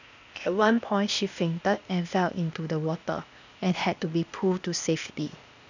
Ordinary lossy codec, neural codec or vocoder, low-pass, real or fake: none; codec, 16 kHz, 0.8 kbps, ZipCodec; 7.2 kHz; fake